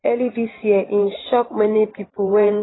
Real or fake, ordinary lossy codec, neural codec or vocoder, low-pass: fake; AAC, 16 kbps; vocoder, 44.1 kHz, 128 mel bands every 512 samples, BigVGAN v2; 7.2 kHz